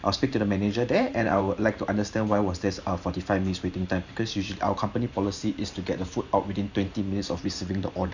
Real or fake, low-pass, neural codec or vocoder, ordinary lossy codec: fake; 7.2 kHz; vocoder, 44.1 kHz, 128 mel bands every 512 samples, BigVGAN v2; none